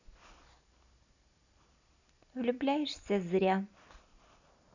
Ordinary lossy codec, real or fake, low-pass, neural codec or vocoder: none; real; 7.2 kHz; none